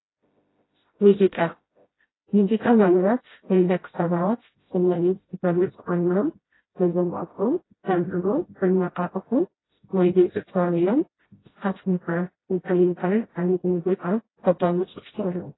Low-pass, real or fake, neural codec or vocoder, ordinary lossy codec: 7.2 kHz; fake; codec, 16 kHz, 0.5 kbps, FreqCodec, smaller model; AAC, 16 kbps